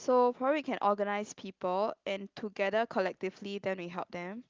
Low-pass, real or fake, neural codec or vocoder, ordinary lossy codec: 7.2 kHz; real; none; Opus, 24 kbps